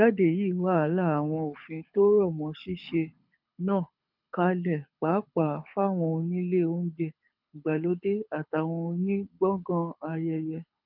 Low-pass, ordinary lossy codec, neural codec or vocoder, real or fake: 5.4 kHz; none; codec, 24 kHz, 6 kbps, HILCodec; fake